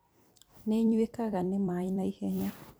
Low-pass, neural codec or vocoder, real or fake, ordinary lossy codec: none; vocoder, 44.1 kHz, 128 mel bands every 256 samples, BigVGAN v2; fake; none